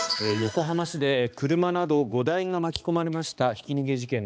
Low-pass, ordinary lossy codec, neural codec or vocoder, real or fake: none; none; codec, 16 kHz, 2 kbps, X-Codec, HuBERT features, trained on balanced general audio; fake